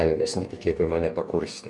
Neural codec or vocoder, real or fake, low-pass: codec, 44.1 kHz, 2.6 kbps, DAC; fake; 10.8 kHz